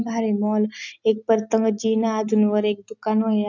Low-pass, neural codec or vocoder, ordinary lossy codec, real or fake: 7.2 kHz; none; none; real